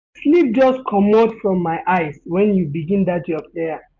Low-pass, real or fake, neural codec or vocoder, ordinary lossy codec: 7.2 kHz; real; none; MP3, 64 kbps